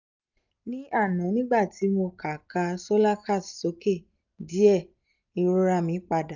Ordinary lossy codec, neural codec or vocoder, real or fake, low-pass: none; none; real; 7.2 kHz